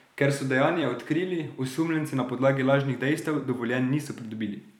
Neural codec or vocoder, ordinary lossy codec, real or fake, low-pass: vocoder, 44.1 kHz, 128 mel bands every 256 samples, BigVGAN v2; none; fake; 19.8 kHz